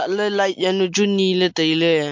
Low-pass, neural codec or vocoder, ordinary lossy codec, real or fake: 7.2 kHz; none; MP3, 48 kbps; real